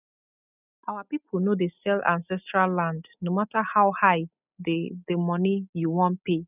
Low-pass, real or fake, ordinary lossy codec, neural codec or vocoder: 3.6 kHz; real; none; none